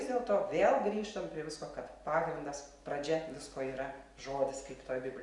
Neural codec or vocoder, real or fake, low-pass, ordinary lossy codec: none; real; 10.8 kHz; Opus, 64 kbps